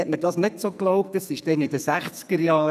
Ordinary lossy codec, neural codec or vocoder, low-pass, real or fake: none; codec, 44.1 kHz, 2.6 kbps, SNAC; 14.4 kHz; fake